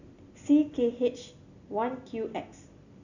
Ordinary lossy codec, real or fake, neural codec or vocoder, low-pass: none; real; none; 7.2 kHz